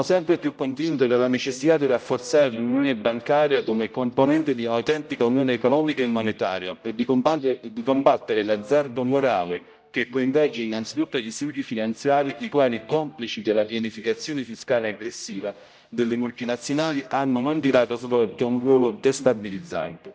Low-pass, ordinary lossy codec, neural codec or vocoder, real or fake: none; none; codec, 16 kHz, 0.5 kbps, X-Codec, HuBERT features, trained on general audio; fake